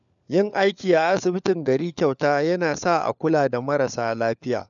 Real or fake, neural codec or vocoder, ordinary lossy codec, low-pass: fake; codec, 16 kHz, 4 kbps, FunCodec, trained on LibriTTS, 50 frames a second; none; 7.2 kHz